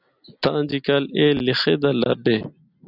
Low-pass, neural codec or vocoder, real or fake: 5.4 kHz; none; real